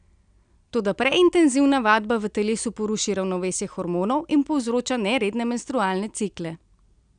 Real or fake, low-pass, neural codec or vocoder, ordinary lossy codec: real; 9.9 kHz; none; none